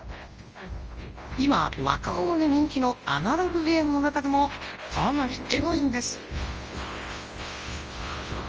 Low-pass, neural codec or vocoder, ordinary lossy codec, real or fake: 7.2 kHz; codec, 24 kHz, 0.9 kbps, WavTokenizer, large speech release; Opus, 24 kbps; fake